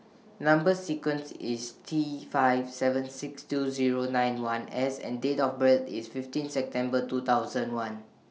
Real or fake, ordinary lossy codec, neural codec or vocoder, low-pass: real; none; none; none